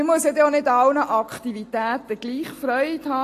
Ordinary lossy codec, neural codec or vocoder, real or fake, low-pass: AAC, 48 kbps; none; real; 14.4 kHz